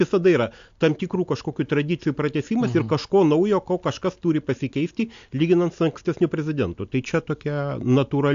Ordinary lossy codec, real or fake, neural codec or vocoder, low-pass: AAC, 64 kbps; real; none; 7.2 kHz